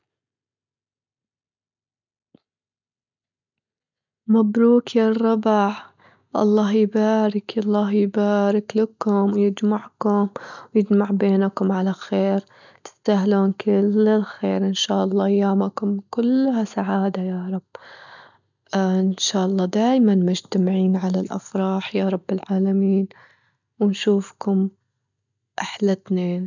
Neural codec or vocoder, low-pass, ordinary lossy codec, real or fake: none; 7.2 kHz; none; real